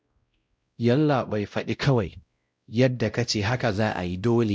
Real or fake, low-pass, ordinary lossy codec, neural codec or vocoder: fake; none; none; codec, 16 kHz, 0.5 kbps, X-Codec, WavLM features, trained on Multilingual LibriSpeech